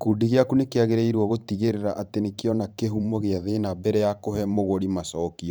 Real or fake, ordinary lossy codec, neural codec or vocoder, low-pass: fake; none; vocoder, 44.1 kHz, 128 mel bands every 512 samples, BigVGAN v2; none